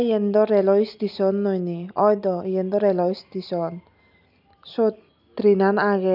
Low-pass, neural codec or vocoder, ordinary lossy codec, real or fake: 5.4 kHz; none; none; real